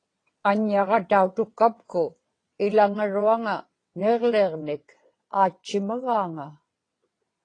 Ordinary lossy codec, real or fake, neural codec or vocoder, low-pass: AAC, 32 kbps; fake; vocoder, 22.05 kHz, 80 mel bands, WaveNeXt; 9.9 kHz